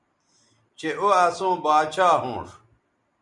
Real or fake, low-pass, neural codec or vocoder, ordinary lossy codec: real; 10.8 kHz; none; Opus, 64 kbps